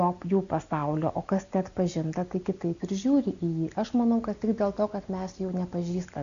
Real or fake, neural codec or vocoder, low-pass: real; none; 7.2 kHz